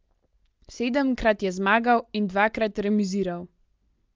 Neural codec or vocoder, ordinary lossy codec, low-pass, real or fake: none; Opus, 24 kbps; 7.2 kHz; real